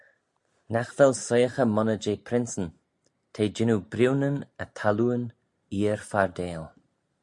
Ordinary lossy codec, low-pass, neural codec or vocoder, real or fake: MP3, 64 kbps; 10.8 kHz; none; real